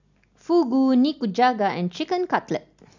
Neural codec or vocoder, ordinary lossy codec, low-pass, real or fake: none; none; 7.2 kHz; real